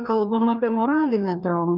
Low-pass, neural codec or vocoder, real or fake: 5.4 kHz; codec, 16 kHz, 2 kbps, FreqCodec, larger model; fake